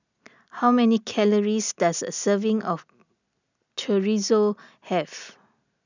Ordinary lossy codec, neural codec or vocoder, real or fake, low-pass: none; none; real; 7.2 kHz